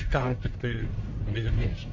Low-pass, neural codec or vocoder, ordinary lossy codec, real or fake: 7.2 kHz; codec, 44.1 kHz, 1.7 kbps, Pupu-Codec; MP3, 48 kbps; fake